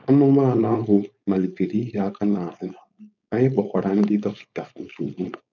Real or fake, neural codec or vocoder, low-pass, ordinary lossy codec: fake; codec, 16 kHz, 4.8 kbps, FACodec; 7.2 kHz; none